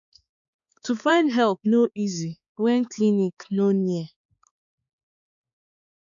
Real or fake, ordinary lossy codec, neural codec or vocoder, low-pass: fake; none; codec, 16 kHz, 4 kbps, X-Codec, HuBERT features, trained on balanced general audio; 7.2 kHz